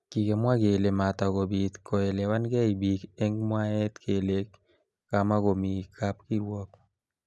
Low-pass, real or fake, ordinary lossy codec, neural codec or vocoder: none; real; none; none